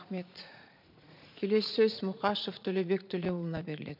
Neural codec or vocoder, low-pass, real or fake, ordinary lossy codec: vocoder, 44.1 kHz, 80 mel bands, Vocos; 5.4 kHz; fake; MP3, 48 kbps